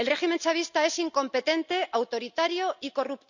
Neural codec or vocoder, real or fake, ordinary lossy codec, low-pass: none; real; none; 7.2 kHz